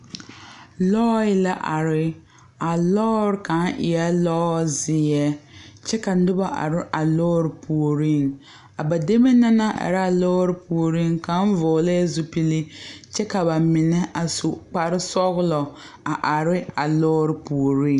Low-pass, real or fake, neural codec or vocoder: 10.8 kHz; real; none